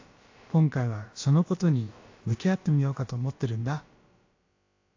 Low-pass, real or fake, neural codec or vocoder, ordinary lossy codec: 7.2 kHz; fake; codec, 16 kHz, about 1 kbps, DyCAST, with the encoder's durations; AAC, 48 kbps